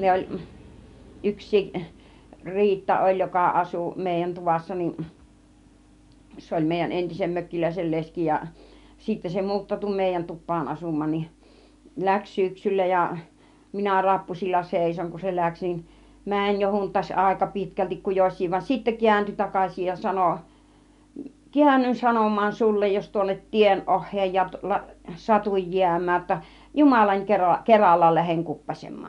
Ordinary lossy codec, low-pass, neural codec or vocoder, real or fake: none; 10.8 kHz; none; real